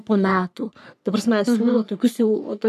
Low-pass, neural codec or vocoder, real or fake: 14.4 kHz; codec, 44.1 kHz, 3.4 kbps, Pupu-Codec; fake